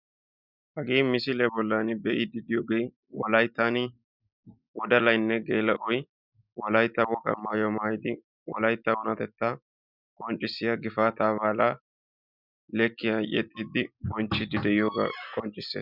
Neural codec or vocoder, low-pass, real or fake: none; 5.4 kHz; real